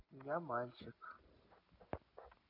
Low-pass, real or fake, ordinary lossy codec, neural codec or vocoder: 5.4 kHz; real; none; none